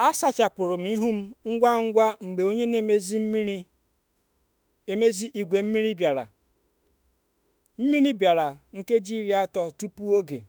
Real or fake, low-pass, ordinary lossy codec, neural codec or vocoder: fake; none; none; autoencoder, 48 kHz, 32 numbers a frame, DAC-VAE, trained on Japanese speech